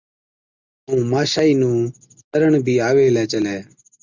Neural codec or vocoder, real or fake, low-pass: none; real; 7.2 kHz